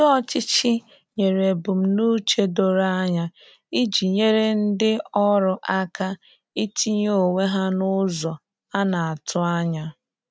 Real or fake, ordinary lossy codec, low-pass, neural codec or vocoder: real; none; none; none